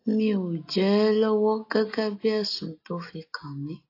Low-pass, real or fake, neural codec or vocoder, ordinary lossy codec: 5.4 kHz; real; none; AAC, 32 kbps